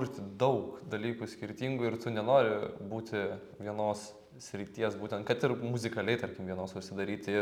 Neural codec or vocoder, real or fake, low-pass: none; real; 19.8 kHz